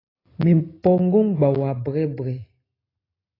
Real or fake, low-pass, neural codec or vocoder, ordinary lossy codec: real; 5.4 kHz; none; AAC, 24 kbps